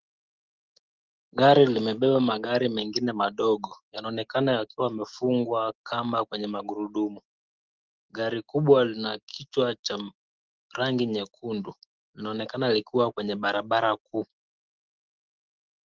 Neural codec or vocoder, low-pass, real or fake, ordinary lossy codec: none; 7.2 kHz; real; Opus, 16 kbps